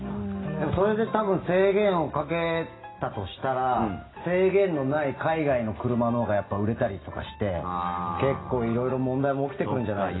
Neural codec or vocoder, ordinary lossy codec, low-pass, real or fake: none; AAC, 16 kbps; 7.2 kHz; real